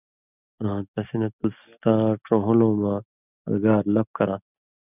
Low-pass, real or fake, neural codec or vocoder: 3.6 kHz; real; none